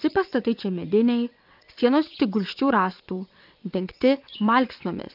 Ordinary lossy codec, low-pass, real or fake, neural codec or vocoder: AAC, 48 kbps; 5.4 kHz; fake; vocoder, 22.05 kHz, 80 mel bands, WaveNeXt